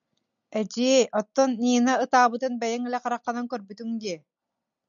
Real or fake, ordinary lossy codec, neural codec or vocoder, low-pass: real; MP3, 96 kbps; none; 7.2 kHz